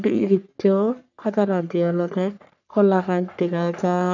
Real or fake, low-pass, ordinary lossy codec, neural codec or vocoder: fake; 7.2 kHz; none; codec, 44.1 kHz, 3.4 kbps, Pupu-Codec